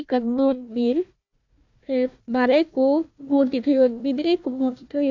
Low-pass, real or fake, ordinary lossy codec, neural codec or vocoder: 7.2 kHz; fake; none; codec, 16 kHz, 1 kbps, FunCodec, trained on Chinese and English, 50 frames a second